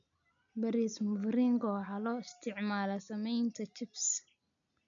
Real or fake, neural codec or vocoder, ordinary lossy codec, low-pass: real; none; none; 7.2 kHz